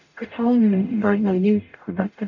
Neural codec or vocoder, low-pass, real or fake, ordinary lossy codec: codec, 44.1 kHz, 0.9 kbps, DAC; 7.2 kHz; fake; none